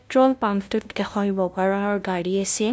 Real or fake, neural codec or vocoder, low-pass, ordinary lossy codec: fake; codec, 16 kHz, 0.5 kbps, FunCodec, trained on LibriTTS, 25 frames a second; none; none